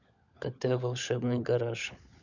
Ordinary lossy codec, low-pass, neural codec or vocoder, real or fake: none; 7.2 kHz; codec, 16 kHz, 16 kbps, FunCodec, trained on LibriTTS, 50 frames a second; fake